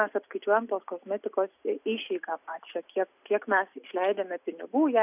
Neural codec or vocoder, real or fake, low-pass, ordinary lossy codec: none; real; 3.6 kHz; AAC, 32 kbps